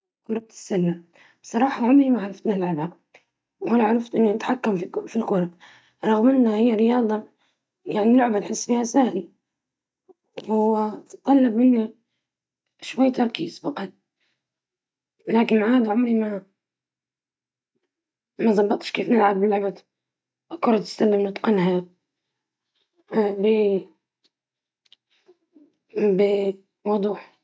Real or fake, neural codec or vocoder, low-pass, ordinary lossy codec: real; none; none; none